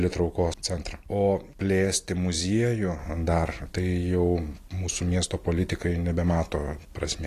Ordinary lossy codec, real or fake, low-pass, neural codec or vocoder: AAC, 48 kbps; real; 14.4 kHz; none